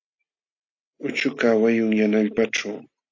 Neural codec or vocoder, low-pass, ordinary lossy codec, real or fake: none; 7.2 kHz; AAC, 48 kbps; real